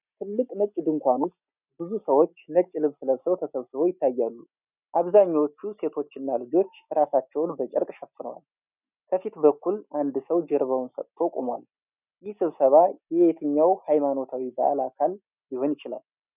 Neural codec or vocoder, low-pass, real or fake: none; 3.6 kHz; real